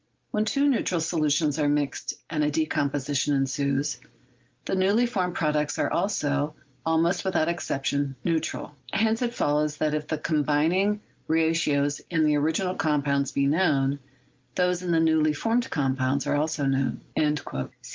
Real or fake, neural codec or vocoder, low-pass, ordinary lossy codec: real; none; 7.2 kHz; Opus, 32 kbps